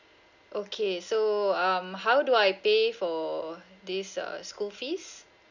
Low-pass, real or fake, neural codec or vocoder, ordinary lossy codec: 7.2 kHz; real; none; none